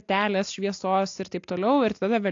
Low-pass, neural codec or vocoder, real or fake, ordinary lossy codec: 7.2 kHz; none; real; AAC, 48 kbps